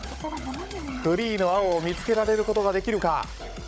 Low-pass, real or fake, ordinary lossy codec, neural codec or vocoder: none; fake; none; codec, 16 kHz, 16 kbps, FunCodec, trained on Chinese and English, 50 frames a second